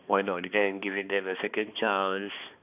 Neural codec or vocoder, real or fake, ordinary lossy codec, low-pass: codec, 16 kHz, 2 kbps, X-Codec, HuBERT features, trained on balanced general audio; fake; none; 3.6 kHz